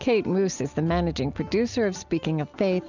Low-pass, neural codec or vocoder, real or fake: 7.2 kHz; none; real